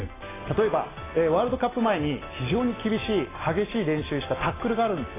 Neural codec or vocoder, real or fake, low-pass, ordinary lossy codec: none; real; 3.6 kHz; AAC, 16 kbps